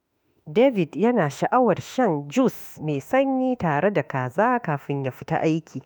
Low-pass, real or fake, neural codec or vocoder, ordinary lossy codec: none; fake; autoencoder, 48 kHz, 32 numbers a frame, DAC-VAE, trained on Japanese speech; none